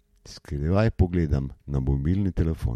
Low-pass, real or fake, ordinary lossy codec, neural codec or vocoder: 19.8 kHz; real; MP3, 64 kbps; none